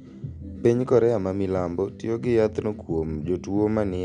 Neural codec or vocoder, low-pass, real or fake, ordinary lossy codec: none; 9.9 kHz; real; MP3, 96 kbps